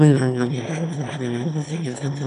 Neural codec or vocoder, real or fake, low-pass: autoencoder, 22.05 kHz, a latent of 192 numbers a frame, VITS, trained on one speaker; fake; 9.9 kHz